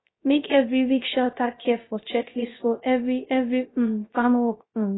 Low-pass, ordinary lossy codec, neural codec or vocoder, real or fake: 7.2 kHz; AAC, 16 kbps; codec, 16 kHz, 0.3 kbps, FocalCodec; fake